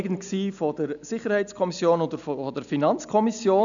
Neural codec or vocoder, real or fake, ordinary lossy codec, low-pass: none; real; none; 7.2 kHz